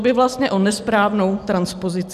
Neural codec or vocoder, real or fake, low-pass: none; real; 14.4 kHz